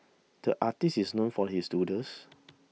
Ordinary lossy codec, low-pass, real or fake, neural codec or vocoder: none; none; real; none